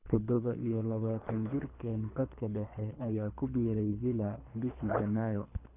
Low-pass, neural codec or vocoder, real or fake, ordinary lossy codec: 3.6 kHz; codec, 24 kHz, 3 kbps, HILCodec; fake; none